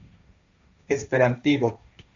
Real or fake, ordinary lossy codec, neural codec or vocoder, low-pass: fake; AAC, 64 kbps; codec, 16 kHz, 1.1 kbps, Voila-Tokenizer; 7.2 kHz